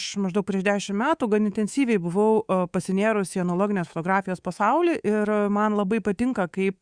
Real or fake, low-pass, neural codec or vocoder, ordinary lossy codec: fake; 9.9 kHz; codec, 24 kHz, 3.1 kbps, DualCodec; Opus, 64 kbps